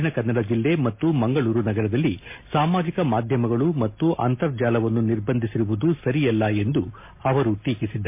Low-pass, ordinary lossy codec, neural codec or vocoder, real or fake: 3.6 kHz; MP3, 24 kbps; none; real